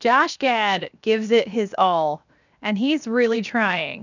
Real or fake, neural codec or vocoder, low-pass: fake; codec, 16 kHz, 0.7 kbps, FocalCodec; 7.2 kHz